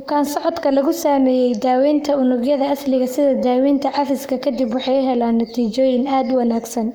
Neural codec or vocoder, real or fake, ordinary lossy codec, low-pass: codec, 44.1 kHz, 7.8 kbps, Pupu-Codec; fake; none; none